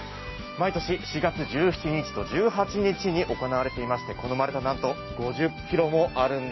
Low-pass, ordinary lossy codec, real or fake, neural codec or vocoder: 7.2 kHz; MP3, 24 kbps; real; none